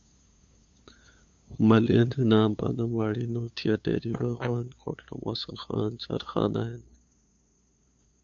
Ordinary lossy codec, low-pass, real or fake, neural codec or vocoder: AAC, 64 kbps; 7.2 kHz; fake; codec, 16 kHz, 2 kbps, FunCodec, trained on LibriTTS, 25 frames a second